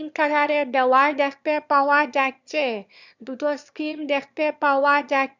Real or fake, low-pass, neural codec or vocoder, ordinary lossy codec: fake; 7.2 kHz; autoencoder, 22.05 kHz, a latent of 192 numbers a frame, VITS, trained on one speaker; none